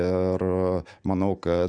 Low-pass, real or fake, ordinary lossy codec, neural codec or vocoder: 9.9 kHz; real; MP3, 96 kbps; none